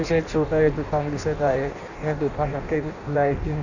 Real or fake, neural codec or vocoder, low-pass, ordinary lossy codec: fake; codec, 16 kHz in and 24 kHz out, 0.6 kbps, FireRedTTS-2 codec; 7.2 kHz; none